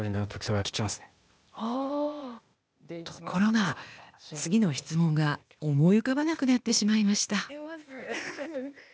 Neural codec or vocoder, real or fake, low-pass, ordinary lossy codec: codec, 16 kHz, 0.8 kbps, ZipCodec; fake; none; none